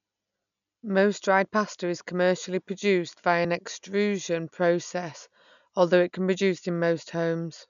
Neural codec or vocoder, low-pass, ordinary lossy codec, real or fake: none; 7.2 kHz; none; real